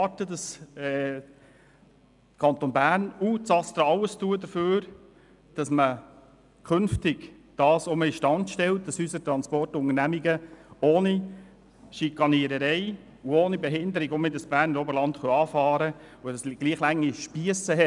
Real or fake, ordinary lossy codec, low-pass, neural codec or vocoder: real; none; 10.8 kHz; none